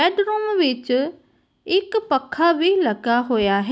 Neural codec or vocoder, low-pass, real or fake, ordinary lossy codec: none; none; real; none